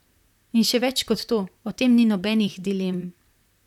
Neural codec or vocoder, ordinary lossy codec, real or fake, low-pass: vocoder, 44.1 kHz, 128 mel bands, Pupu-Vocoder; none; fake; 19.8 kHz